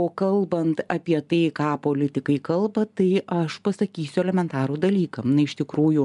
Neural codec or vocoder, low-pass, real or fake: none; 10.8 kHz; real